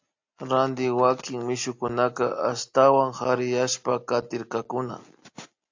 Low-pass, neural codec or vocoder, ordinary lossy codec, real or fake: 7.2 kHz; none; AAC, 48 kbps; real